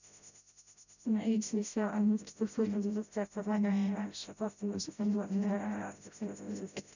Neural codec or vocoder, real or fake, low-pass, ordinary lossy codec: codec, 16 kHz, 0.5 kbps, FreqCodec, smaller model; fake; 7.2 kHz; none